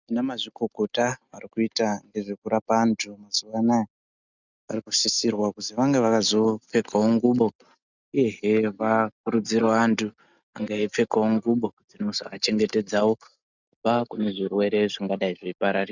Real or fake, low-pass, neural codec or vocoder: real; 7.2 kHz; none